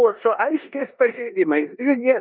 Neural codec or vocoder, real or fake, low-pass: codec, 16 kHz in and 24 kHz out, 0.9 kbps, LongCat-Audio-Codec, four codebook decoder; fake; 5.4 kHz